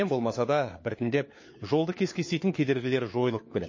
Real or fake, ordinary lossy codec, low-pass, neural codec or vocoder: fake; MP3, 32 kbps; 7.2 kHz; codec, 16 kHz, 4 kbps, FunCodec, trained on LibriTTS, 50 frames a second